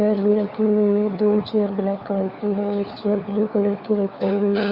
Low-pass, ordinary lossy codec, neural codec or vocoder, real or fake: 5.4 kHz; Opus, 64 kbps; codec, 16 kHz, 4 kbps, FunCodec, trained on LibriTTS, 50 frames a second; fake